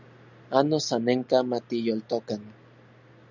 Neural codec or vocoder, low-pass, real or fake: none; 7.2 kHz; real